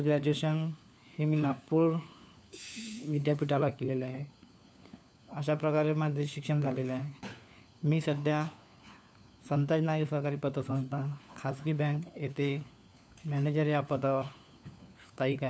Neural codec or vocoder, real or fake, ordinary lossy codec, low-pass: codec, 16 kHz, 4 kbps, FunCodec, trained on LibriTTS, 50 frames a second; fake; none; none